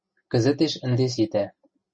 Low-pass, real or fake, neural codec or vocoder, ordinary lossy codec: 9.9 kHz; real; none; MP3, 32 kbps